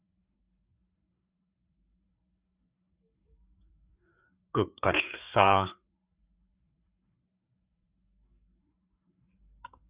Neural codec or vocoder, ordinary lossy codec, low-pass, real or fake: codec, 16 kHz, 4 kbps, FreqCodec, larger model; Opus, 64 kbps; 3.6 kHz; fake